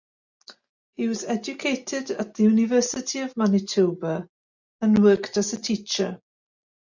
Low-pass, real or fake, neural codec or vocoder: 7.2 kHz; real; none